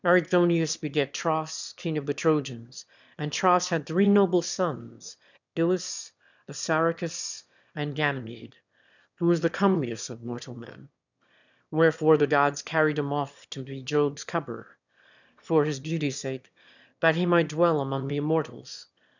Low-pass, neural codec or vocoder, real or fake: 7.2 kHz; autoencoder, 22.05 kHz, a latent of 192 numbers a frame, VITS, trained on one speaker; fake